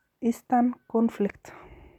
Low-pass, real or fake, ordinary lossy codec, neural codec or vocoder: 19.8 kHz; real; none; none